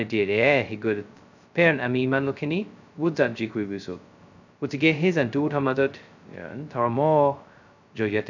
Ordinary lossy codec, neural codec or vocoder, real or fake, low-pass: none; codec, 16 kHz, 0.2 kbps, FocalCodec; fake; 7.2 kHz